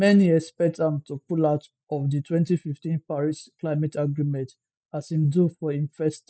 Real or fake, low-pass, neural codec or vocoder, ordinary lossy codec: real; none; none; none